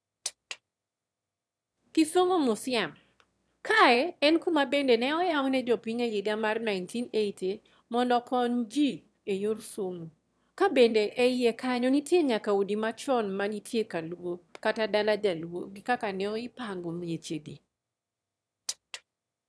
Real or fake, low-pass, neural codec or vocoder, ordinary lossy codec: fake; none; autoencoder, 22.05 kHz, a latent of 192 numbers a frame, VITS, trained on one speaker; none